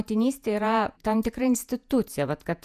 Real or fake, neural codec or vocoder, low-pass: fake; vocoder, 48 kHz, 128 mel bands, Vocos; 14.4 kHz